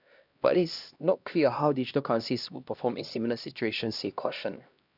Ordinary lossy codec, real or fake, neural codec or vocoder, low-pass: none; fake; codec, 16 kHz, 1 kbps, X-Codec, HuBERT features, trained on LibriSpeech; 5.4 kHz